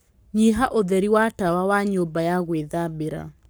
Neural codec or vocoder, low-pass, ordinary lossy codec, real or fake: codec, 44.1 kHz, 7.8 kbps, Pupu-Codec; none; none; fake